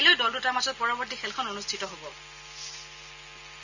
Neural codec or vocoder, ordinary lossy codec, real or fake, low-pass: none; none; real; 7.2 kHz